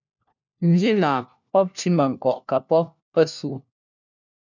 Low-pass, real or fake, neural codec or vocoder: 7.2 kHz; fake; codec, 16 kHz, 1 kbps, FunCodec, trained on LibriTTS, 50 frames a second